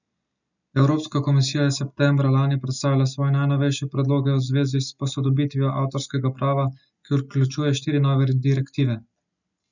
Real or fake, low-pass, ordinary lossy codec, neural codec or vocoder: real; 7.2 kHz; none; none